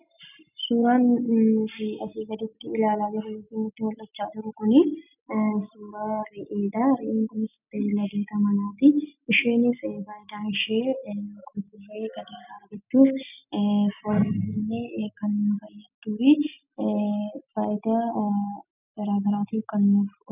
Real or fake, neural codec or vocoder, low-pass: real; none; 3.6 kHz